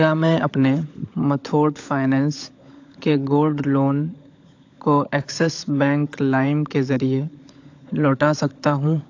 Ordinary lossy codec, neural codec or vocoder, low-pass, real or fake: AAC, 48 kbps; codec, 16 kHz, 16 kbps, FunCodec, trained on LibriTTS, 50 frames a second; 7.2 kHz; fake